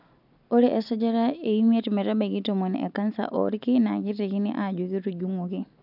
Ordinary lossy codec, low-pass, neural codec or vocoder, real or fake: none; 5.4 kHz; none; real